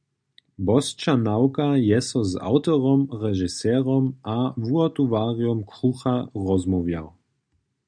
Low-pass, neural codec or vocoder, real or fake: 9.9 kHz; none; real